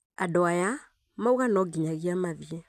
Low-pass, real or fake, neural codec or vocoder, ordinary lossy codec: 14.4 kHz; real; none; none